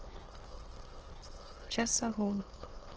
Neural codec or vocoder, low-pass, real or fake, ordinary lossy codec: autoencoder, 22.05 kHz, a latent of 192 numbers a frame, VITS, trained on many speakers; 7.2 kHz; fake; Opus, 16 kbps